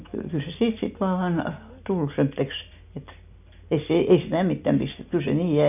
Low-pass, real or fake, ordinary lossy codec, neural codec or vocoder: 3.6 kHz; real; none; none